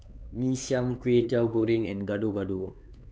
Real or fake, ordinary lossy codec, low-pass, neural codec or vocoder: fake; none; none; codec, 16 kHz, 4 kbps, X-Codec, HuBERT features, trained on LibriSpeech